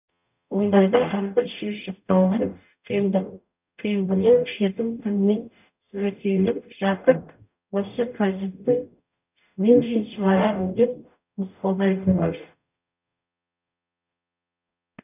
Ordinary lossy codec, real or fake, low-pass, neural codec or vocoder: none; fake; 3.6 kHz; codec, 44.1 kHz, 0.9 kbps, DAC